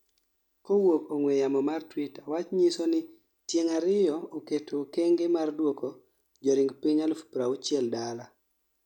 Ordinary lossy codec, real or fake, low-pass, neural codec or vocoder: none; real; 19.8 kHz; none